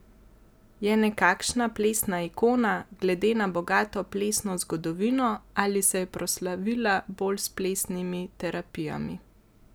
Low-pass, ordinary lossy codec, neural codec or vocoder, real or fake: none; none; none; real